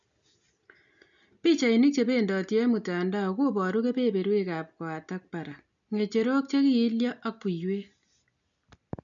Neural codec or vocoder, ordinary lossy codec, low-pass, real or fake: none; none; 7.2 kHz; real